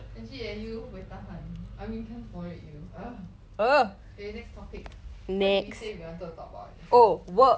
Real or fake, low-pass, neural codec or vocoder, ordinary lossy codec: real; none; none; none